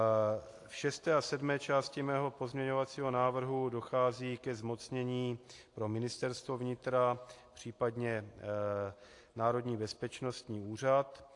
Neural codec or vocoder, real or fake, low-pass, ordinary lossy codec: none; real; 10.8 kHz; AAC, 48 kbps